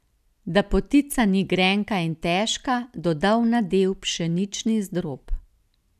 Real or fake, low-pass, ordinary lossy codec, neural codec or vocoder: real; 14.4 kHz; none; none